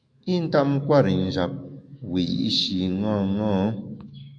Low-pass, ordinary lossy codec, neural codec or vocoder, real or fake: 9.9 kHz; MP3, 64 kbps; autoencoder, 48 kHz, 128 numbers a frame, DAC-VAE, trained on Japanese speech; fake